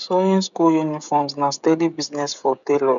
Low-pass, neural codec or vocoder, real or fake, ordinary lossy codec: 7.2 kHz; codec, 16 kHz, 16 kbps, FreqCodec, smaller model; fake; none